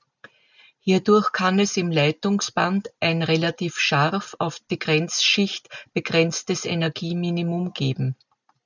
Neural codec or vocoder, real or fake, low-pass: none; real; 7.2 kHz